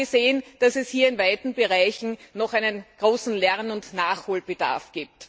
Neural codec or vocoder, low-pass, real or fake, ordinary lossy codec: none; none; real; none